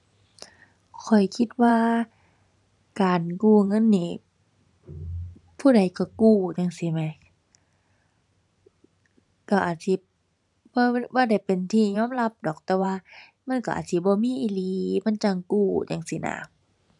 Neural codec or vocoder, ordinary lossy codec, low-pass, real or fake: vocoder, 44.1 kHz, 128 mel bands every 512 samples, BigVGAN v2; none; 10.8 kHz; fake